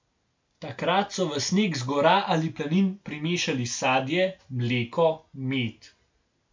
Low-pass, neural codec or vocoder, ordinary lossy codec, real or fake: 7.2 kHz; none; MP3, 64 kbps; real